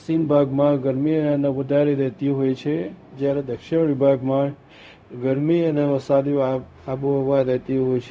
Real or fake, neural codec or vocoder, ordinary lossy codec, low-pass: fake; codec, 16 kHz, 0.4 kbps, LongCat-Audio-Codec; none; none